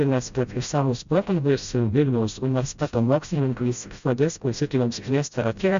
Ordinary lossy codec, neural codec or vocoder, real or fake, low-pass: Opus, 64 kbps; codec, 16 kHz, 0.5 kbps, FreqCodec, smaller model; fake; 7.2 kHz